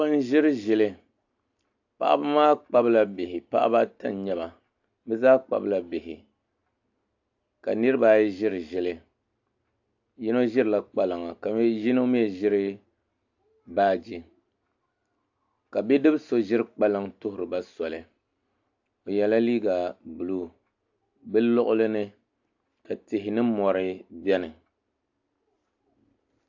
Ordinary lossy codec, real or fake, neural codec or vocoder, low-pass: AAC, 48 kbps; real; none; 7.2 kHz